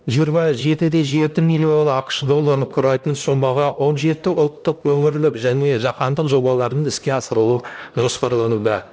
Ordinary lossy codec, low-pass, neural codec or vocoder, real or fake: none; none; codec, 16 kHz, 1 kbps, X-Codec, HuBERT features, trained on LibriSpeech; fake